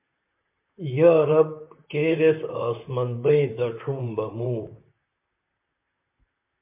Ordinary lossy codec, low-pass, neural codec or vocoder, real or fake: MP3, 32 kbps; 3.6 kHz; vocoder, 44.1 kHz, 128 mel bands, Pupu-Vocoder; fake